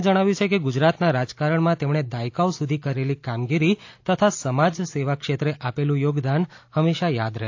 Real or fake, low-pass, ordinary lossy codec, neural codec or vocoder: real; 7.2 kHz; AAC, 48 kbps; none